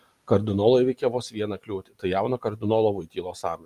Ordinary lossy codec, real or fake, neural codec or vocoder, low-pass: Opus, 32 kbps; real; none; 14.4 kHz